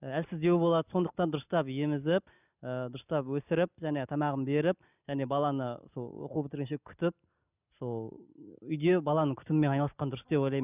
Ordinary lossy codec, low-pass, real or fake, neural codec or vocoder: none; 3.6 kHz; real; none